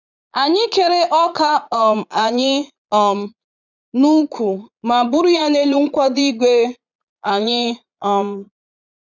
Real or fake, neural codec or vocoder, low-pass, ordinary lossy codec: fake; vocoder, 22.05 kHz, 80 mel bands, Vocos; 7.2 kHz; none